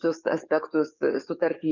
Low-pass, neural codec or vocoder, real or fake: 7.2 kHz; codec, 44.1 kHz, 7.8 kbps, DAC; fake